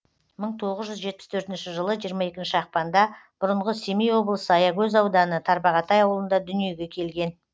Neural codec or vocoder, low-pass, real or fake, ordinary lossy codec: none; none; real; none